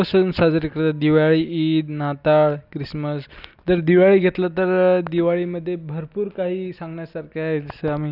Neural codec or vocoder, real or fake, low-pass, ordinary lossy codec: none; real; 5.4 kHz; none